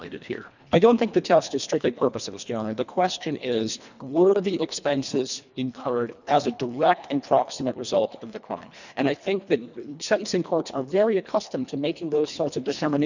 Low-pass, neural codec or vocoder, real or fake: 7.2 kHz; codec, 24 kHz, 1.5 kbps, HILCodec; fake